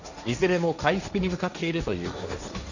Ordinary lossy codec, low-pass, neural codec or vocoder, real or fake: none; 7.2 kHz; codec, 16 kHz, 1.1 kbps, Voila-Tokenizer; fake